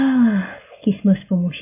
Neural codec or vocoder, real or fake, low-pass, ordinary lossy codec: none; real; 3.6 kHz; MP3, 24 kbps